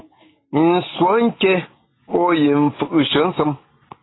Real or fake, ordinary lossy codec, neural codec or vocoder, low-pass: real; AAC, 16 kbps; none; 7.2 kHz